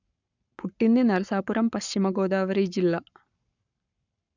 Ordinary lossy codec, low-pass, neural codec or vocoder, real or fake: none; 7.2 kHz; codec, 44.1 kHz, 7.8 kbps, Pupu-Codec; fake